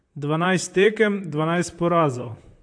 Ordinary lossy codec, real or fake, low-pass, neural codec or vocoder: AAC, 64 kbps; fake; 9.9 kHz; vocoder, 44.1 kHz, 128 mel bands, Pupu-Vocoder